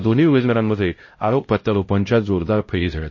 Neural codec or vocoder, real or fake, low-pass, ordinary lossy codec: codec, 16 kHz, 0.5 kbps, X-Codec, HuBERT features, trained on LibriSpeech; fake; 7.2 kHz; MP3, 32 kbps